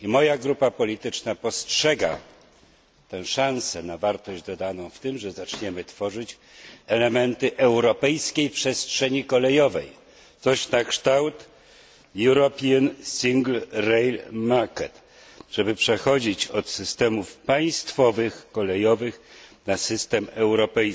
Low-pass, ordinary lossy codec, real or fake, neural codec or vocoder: none; none; real; none